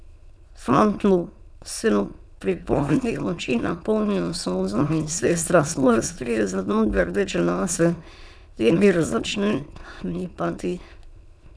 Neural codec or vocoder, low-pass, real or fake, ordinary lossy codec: autoencoder, 22.05 kHz, a latent of 192 numbers a frame, VITS, trained on many speakers; none; fake; none